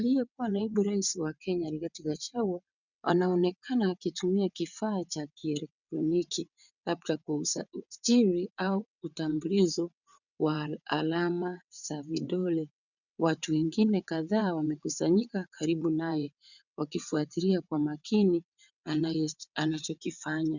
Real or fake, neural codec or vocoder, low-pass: fake; vocoder, 22.05 kHz, 80 mel bands, WaveNeXt; 7.2 kHz